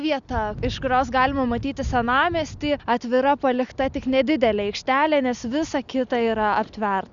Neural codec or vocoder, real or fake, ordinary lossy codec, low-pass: none; real; Opus, 64 kbps; 7.2 kHz